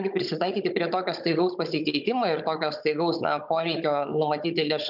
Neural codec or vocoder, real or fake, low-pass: codec, 16 kHz, 16 kbps, FunCodec, trained on Chinese and English, 50 frames a second; fake; 5.4 kHz